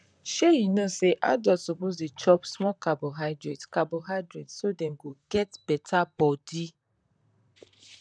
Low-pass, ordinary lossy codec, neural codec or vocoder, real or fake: 9.9 kHz; none; vocoder, 44.1 kHz, 128 mel bands, Pupu-Vocoder; fake